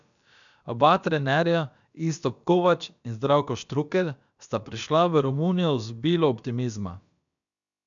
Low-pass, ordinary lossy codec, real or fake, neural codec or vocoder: 7.2 kHz; none; fake; codec, 16 kHz, about 1 kbps, DyCAST, with the encoder's durations